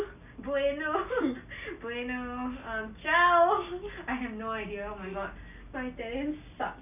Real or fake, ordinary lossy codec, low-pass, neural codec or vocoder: real; none; 3.6 kHz; none